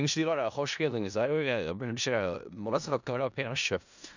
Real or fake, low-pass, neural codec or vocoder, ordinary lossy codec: fake; 7.2 kHz; codec, 16 kHz in and 24 kHz out, 0.4 kbps, LongCat-Audio-Codec, four codebook decoder; none